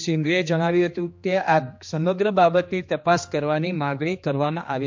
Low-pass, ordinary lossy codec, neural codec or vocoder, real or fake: 7.2 kHz; MP3, 48 kbps; codec, 16 kHz, 2 kbps, X-Codec, HuBERT features, trained on general audio; fake